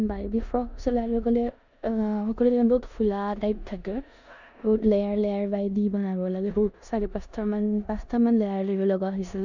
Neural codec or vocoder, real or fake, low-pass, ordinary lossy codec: codec, 16 kHz in and 24 kHz out, 0.9 kbps, LongCat-Audio-Codec, four codebook decoder; fake; 7.2 kHz; none